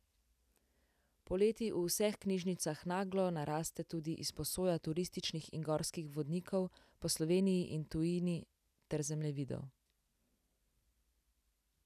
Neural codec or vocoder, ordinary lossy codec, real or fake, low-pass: none; none; real; 14.4 kHz